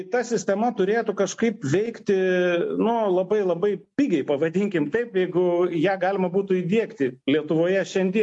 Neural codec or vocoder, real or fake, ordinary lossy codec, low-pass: none; real; MP3, 48 kbps; 10.8 kHz